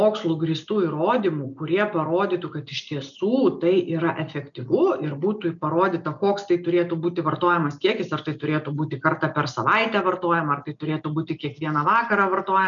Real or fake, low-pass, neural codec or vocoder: real; 7.2 kHz; none